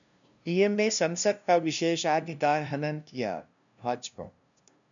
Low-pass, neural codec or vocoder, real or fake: 7.2 kHz; codec, 16 kHz, 0.5 kbps, FunCodec, trained on LibriTTS, 25 frames a second; fake